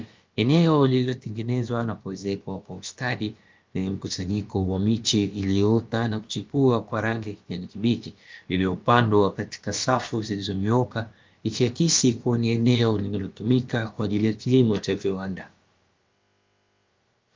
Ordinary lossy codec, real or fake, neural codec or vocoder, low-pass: Opus, 32 kbps; fake; codec, 16 kHz, about 1 kbps, DyCAST, with the encoder's durations; 7.2 kHz